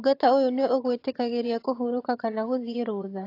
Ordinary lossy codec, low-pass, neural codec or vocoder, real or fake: AAC, 32 kbps; 5.4 kHz; vocoder, 22.05 kHz, 80 mel bands, HiFi-GAN; fake